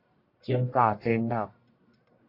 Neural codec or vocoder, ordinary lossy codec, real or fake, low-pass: codec, 44.1 kHz, 1.7 kbps, Pupu-Codec; AAC, 32 kbps; fake; 5.4 kHz